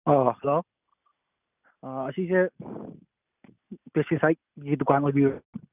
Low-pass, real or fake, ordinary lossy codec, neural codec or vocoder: 3.6 kHz; real; none; none